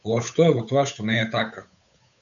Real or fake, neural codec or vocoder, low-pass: fake; codec, 16 kHz, 8 kbps, FunCodec, trained on Chinese and English, 25 frames a second; 7.2 kHz